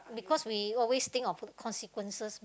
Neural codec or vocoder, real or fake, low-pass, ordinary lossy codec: none; real; none; none